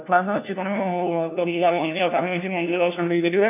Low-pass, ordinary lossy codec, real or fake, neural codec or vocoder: 3.6 kHz; none; fake; codec, 16 kHz, 1 kbps, FunCodec, trained on LibriTTS, 50 frames a second